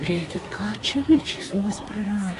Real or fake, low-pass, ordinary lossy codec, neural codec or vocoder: fake; 10.8 kHz; AAC, 48 kbps; codec, 24 kHz, 1 kbps, SNAC